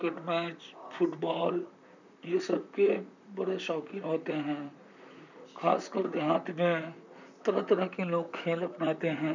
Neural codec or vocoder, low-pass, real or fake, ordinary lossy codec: vocoder, 44.1 kHz, 128 mel bands, Pupu-Vocoder; 7.2 kHz; fake; none